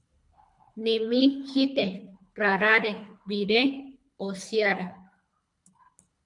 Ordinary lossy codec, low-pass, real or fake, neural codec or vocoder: MP3, 64 kbps; 10.8 kHz; fake; codec, 24 kHz, 3 kbps, HILCodec